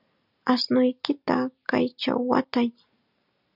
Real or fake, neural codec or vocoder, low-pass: real; none; 5.4 kHz